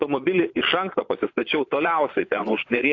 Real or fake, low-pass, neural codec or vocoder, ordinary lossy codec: fake; 7.2 kHz; vocoder, 22.05 kHz, 80 mel bands, Vocos; AAC, 48 kbps